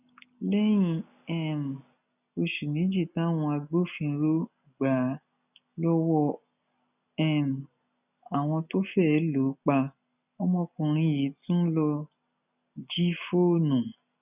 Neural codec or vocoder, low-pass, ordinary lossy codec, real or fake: none; 3.6 kHz; none; real